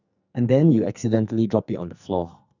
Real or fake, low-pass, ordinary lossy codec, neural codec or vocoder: fake; 7.2 kHz; none; codec, 44.1 kHz, 2.6 kbps, SNAC